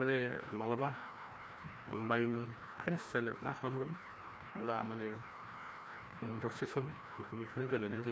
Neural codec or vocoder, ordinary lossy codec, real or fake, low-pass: codec, 16 kHz, 1 kbps, FreqCodec, larger model; none; fake; none